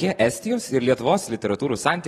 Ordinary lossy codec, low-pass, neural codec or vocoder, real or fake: AAC, 32 kbps; 19.8 kHz; vocoder, 44.1 kHz, 128 mel bands every 256 samples, BigVGAN v2; fake